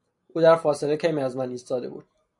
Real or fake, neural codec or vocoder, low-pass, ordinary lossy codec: real; none; 10.8 kHz; MP3, 64 kbps